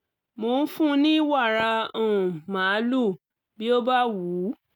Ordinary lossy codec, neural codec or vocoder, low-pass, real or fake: none; none; 19.8 kHz; real